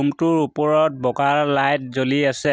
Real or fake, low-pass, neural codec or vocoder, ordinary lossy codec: real; none; none; none